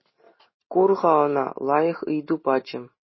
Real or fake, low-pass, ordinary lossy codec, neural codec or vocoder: real; 7.2 kHz; MP3, 24 kbps; none